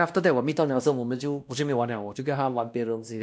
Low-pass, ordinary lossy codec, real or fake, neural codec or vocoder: none; none; fake; codec, 16 kHz, 1 kbps, X-Codec, WavLM features, trained on Multilingual LibriSpeech